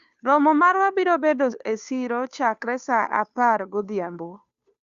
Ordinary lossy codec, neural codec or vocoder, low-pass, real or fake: Opus, 64 kbps; codec, 16 kHz, 2 kbps, FunCodec, trained on Chinese and English, 25 frames a second; 7.2 kHz; fake